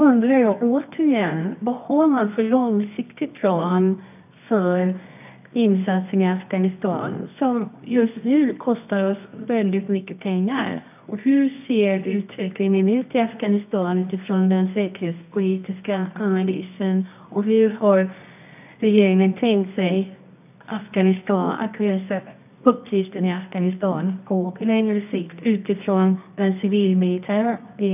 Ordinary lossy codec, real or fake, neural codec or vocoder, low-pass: none; fake; codec, 24 kHz, 0.9 kbps, WavTokenizer, medium music audio release; 3.6 kHz